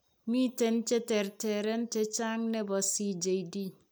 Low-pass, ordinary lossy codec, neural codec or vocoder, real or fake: none; none; none; real